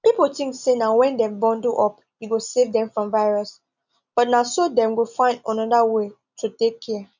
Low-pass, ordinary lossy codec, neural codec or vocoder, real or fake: 7.2 kHz; none; none; real